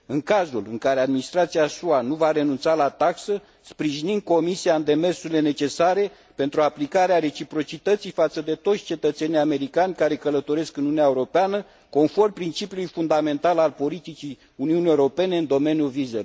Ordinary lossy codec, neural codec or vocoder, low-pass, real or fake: none; none; none; real